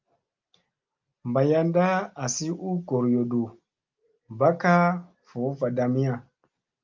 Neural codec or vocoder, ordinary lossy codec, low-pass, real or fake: none; Opus, 24 kbps; 7.2 kHz; real